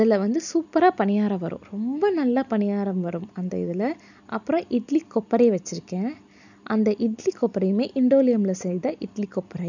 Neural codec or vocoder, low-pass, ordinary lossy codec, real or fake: none; 7.2 kHz; none; real